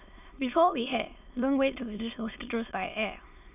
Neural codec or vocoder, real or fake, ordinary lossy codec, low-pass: autoencoder, 22.05 kHz, a latent of 192 numbers a frame, VITS, trained on many speakers; fake; none; 3.6 kHz